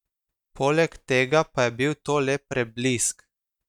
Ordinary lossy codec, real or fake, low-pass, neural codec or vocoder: none; real; 19.8 kHz; none